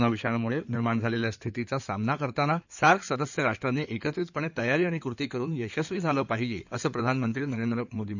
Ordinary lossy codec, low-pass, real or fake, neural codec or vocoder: none; 7.2 kHz; fake; codec, 16 kHz in and 24 kHz out, 2.2 kbps, FireRedTTS-2 codec